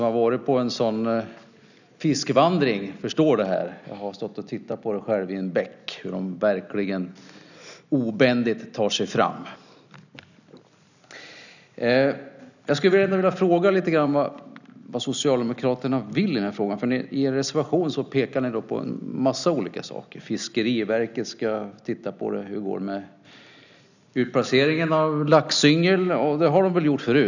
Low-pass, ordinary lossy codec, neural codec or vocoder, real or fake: 7.2 kHz; none; none; real